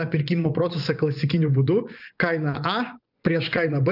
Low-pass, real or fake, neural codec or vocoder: 5.4 kHz; fake; vocoder, 24 kHz, 100 mel bands, Vocos